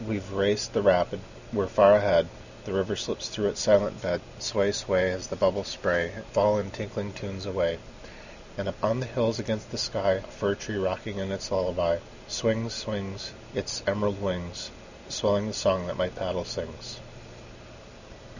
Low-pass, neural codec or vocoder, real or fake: 7.2 kHz; none; real